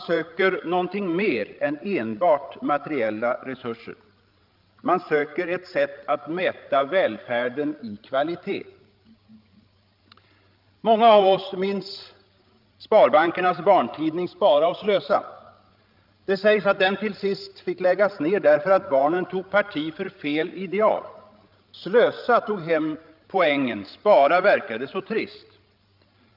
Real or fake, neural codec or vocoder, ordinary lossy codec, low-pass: fake; codec, 16 kHz, 16 kbps, FreqCodec, larger model; Opus, 24 kbps; 5.4 kHz